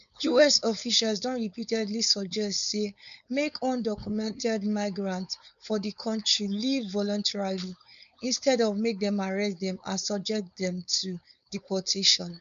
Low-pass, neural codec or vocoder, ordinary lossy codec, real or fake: 7.2 kHz; codec, 16 kHz, 4.8 kbps, FACodec; none; fake